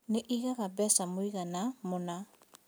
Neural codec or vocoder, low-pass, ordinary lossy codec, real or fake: none; none; none; real